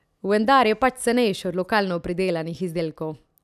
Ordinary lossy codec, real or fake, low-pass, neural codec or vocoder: none; real; 14.4 kHz; none